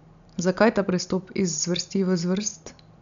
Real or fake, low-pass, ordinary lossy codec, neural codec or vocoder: real; 7.2 kHz; none; none